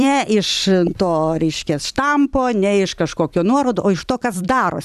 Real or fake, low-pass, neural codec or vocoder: fake; 14.4 kHz; vocoder, 44.1 kHz, 128 mel bands every 256 samples, BigVGAN v2